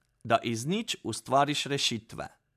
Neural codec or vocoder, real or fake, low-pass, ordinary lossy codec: none; real; 14.4 kHz; MP3, 96 kbps